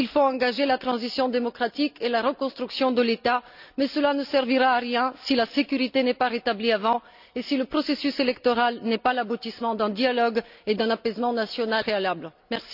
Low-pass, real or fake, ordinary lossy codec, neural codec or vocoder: 5.4 kHz; real; none; none